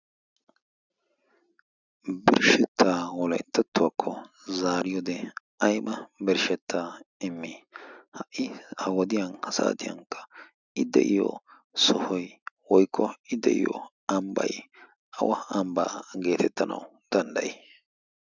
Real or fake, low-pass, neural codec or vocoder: real; 7.2 kHz; none